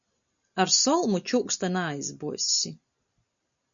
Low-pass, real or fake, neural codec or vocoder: 7.2 kHz; real; none